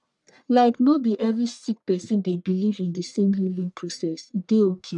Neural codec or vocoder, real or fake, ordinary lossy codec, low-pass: codec, 44.1 kHz, 1.7 kbps, Pupu-Codec; fake; none; 10.8 kHz